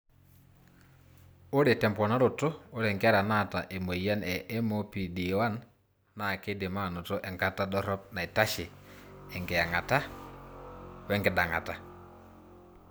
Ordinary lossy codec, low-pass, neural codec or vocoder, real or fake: none; none; none; real